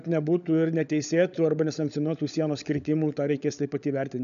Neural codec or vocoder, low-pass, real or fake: codec, 16 kHz, 16 kbps, FunCodec, trained on LibriTTS, 50 frames a second; 7.2 kHz; fake